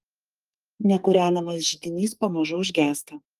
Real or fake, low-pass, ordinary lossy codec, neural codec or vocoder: fake; 14.4 kHz; Opus, 32 kbps; codec, 44.1 kHz, 2.6 kbps, SNAC